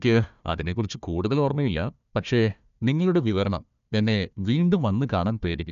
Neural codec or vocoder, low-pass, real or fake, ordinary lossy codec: codec, 16 kHz, 1 kbps, FunCodec, trained on Chinese and English, 50 frames a second; 7.2 kHz; fake; none